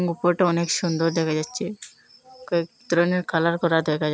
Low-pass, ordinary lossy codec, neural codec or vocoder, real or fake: none; none; none; real